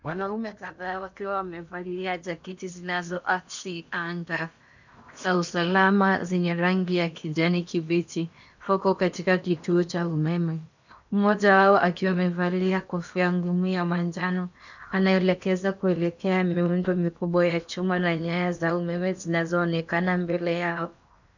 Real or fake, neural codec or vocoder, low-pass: fake; codec, 16 kHz in and 24 kHz out, 0.8 kbps, FocalCodec, streaming, 65536 codes; 7.2 kHz